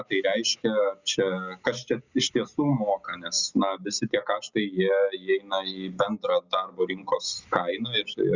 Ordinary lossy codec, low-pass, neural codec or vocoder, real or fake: Opus, 64 kbps; 7.2 kHz; none; real